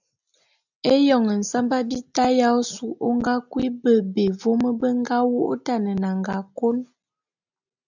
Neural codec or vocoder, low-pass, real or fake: none; 7.2 kHz; real